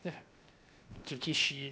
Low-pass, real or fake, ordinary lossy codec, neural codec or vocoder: none; fake; none; codec, 16 kHz, 0.7 kbps, FocalCodec